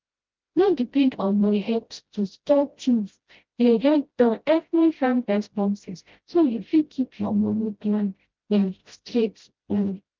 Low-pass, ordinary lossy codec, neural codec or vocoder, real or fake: 7.2 kHz; Opus, 32 kbps; codec, 16 kHz, 0.5 kbps, FreqCodec, smaller model; fake